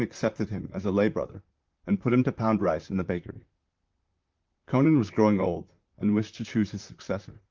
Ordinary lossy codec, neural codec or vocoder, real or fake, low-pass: Opus, 24 kbps; vocoder, 44.1 kHz, 128 mel bands, Pupu-Vocoder; fake; 7.2 kHz